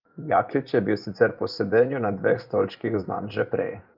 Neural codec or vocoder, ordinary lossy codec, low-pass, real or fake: vocoder, 24 kHz, 100 mel bands, Vocos; Opus, 24 kbps; 5.4 kHz; fake